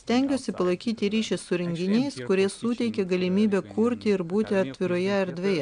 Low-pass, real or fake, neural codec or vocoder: 9.9 kHz; real; none